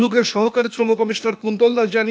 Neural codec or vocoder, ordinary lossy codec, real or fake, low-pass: codec, 16 kHz, 0.8 kbps, ZipCodec; none; fake; none